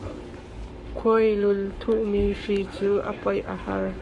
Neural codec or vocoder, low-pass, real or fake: codec, 44.1 kHz, 7.8 kbps, Pupu-Codec; 10.8 kHz; fake